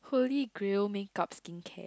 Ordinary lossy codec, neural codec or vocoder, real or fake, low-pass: none; none; real; none